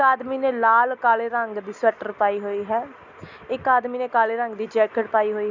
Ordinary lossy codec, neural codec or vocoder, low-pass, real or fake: none; none; 7.2 kHz; real